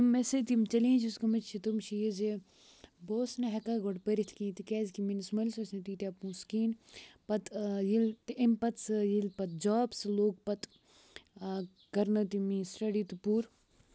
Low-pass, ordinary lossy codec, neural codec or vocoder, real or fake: none; none; none; real